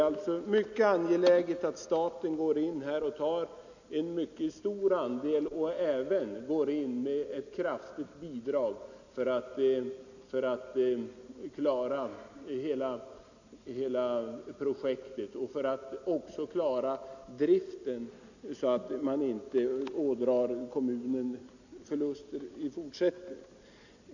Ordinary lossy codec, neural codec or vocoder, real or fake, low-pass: none; none; real; 7.2 kHz